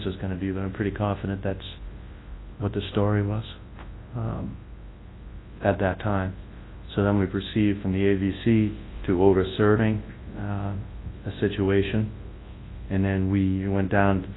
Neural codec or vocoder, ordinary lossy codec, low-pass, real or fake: codec, 24 kHz, 0.9 kbps, WavTokenizer, large speech release; AAC, 16 kbps; 7.2 kHz; fake